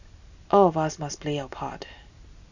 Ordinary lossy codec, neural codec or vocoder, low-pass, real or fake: none; none; 7.2 kHz; real